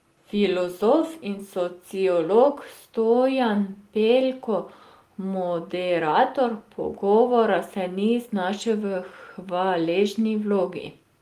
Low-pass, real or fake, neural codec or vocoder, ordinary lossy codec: 14.4 kHz; real; none; Opus, 24 kbps